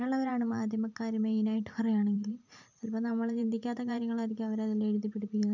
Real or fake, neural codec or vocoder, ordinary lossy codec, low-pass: fake; vocoder, 44.1 kHz, 128 mel bands every 512 samples, BigVGAN v2; none; 7.2 kHz